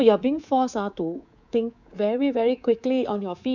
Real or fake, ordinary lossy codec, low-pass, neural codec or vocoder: fake; none; 7.2 kHz; codec, 24 kHz, 3.1 kbps, DualCodec